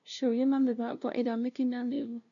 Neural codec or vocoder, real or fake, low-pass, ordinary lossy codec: codec, 16 kHz, 0.5 kbps, FunCodec, trained on LibriTTS, 25 frames a second; fake; 7.2 kHz; none